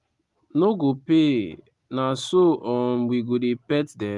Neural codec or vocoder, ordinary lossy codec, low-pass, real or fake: none; Opus, 32 kbps; 10.8 kHz; real